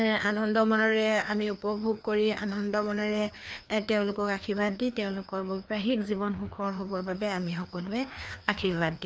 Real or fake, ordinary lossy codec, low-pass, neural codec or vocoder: fake; none; none; codec, 16 kHz, 2 kbps, FreqCodec, larger model